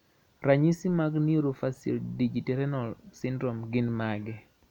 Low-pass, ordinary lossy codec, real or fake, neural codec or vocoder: 19.8 kHz; none; real; none